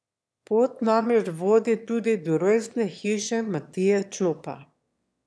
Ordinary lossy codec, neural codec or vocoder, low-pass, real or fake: none; autoencoder, 22.05 kHz, a latent of 192 numbers a frame, VITS, trained on one speaker; none; fake